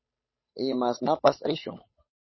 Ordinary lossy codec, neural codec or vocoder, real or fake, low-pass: MP3, 24 kbps; codec, 16 kHz, 8 kbps, FunCodec, trained on Chinese and English, 25 frames a second; fake; 7.2 kHz